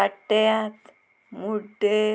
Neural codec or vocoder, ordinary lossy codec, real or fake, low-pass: none; none; real; none